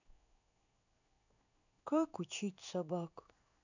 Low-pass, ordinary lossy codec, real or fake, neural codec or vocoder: 7.2 kHz; none; fake; codec, 16 kHz in and 24 kHz out, 1 kbps, XY-Tokenizer